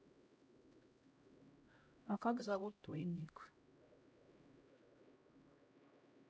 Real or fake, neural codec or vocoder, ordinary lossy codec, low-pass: fake; codec, 16 kHz, 0.5 kbps, X-Codec, HuBERT features, trained on LibriSpeech; none; none